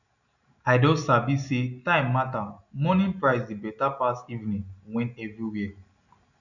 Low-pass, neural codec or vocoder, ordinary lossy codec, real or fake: 7.2 kHz; none; none; real